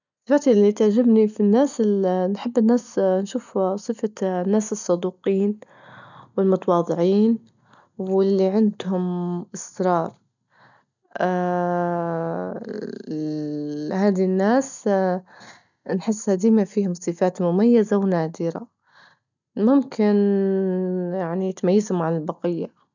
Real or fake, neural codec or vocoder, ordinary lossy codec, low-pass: real; none; none; 7.2 kHz